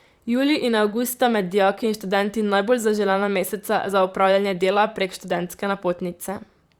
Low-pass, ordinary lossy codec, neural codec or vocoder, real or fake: 19.8 kHz; Opus, 64 kbps; vocoder, 44.1 kHz, 128 mel bands, Pupu-Vocoder; fake